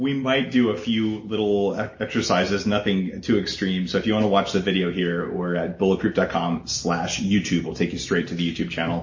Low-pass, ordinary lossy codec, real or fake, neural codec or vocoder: 7.2 kHz; MP3, 32 kbps; real; none